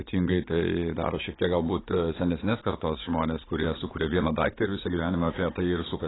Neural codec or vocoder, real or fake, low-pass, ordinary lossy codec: vocoder, 44.1 kHz, 80 mel bands, Vocos; fake; 7.2 kHz; AAC, 16 kbps